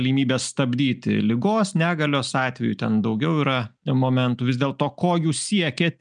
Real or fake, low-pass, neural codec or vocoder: real; 9.9 kHz; none